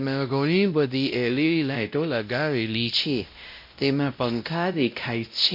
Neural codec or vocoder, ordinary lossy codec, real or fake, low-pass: codec, 16 kHz, 0.5 kbps, X-Codec, WavLM features, trained on Multilingual LibriSpeech; MP3, 32 kbps; fake; 5.4 kHz